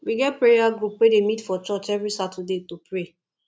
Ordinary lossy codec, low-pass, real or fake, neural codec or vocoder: none; none; real; none